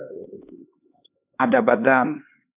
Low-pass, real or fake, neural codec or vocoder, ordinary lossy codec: 3.6 kHz; fake; codec, 16 kHz, 2 kbps, X-Codec, HuBERT features, trained on LibriSpeech; AAC, 24 kbps